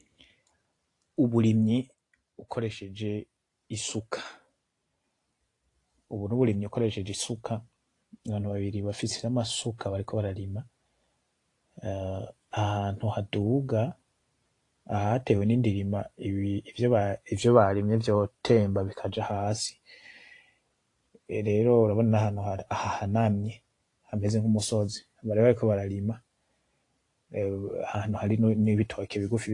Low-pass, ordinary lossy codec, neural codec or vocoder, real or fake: 10.8 kHz; AAC, 48 kbps; vocoder, 44.1 kHz, 128 mel bands every 512 samples, BigVGAN v2; fake